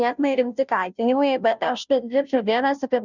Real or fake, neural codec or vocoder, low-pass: fake; codec, 16 kHz, 0.5 kbps, FunCodec, trained on Chinese and English, 25 frames a second; 7.2 kHz